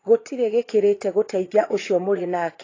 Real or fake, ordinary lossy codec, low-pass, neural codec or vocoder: fake; AAC, 32 kbps; 7.2 kHz; vocoder, 22.05 kHz, 80 mel bands, Vocos